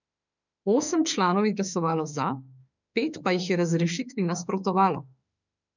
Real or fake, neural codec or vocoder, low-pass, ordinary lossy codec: fake; autoencoder, 48 kHz, 32 numbers a frame, DAC-VAE, trained on Japanese speech; 7.2 kHz; none